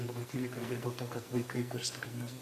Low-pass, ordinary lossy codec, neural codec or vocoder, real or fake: 14.4 kHz; AAC, 96 kbps; codec, 44.1 kHz, 2.6 kbps, SNAC; fake